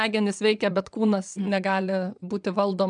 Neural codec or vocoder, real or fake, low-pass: vocoder, 22.05 kHz, 80 mel bands, WaveNeXt; fake; 9.9 kHz